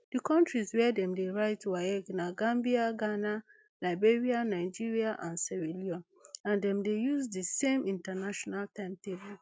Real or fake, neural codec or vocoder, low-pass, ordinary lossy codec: real; none; none; none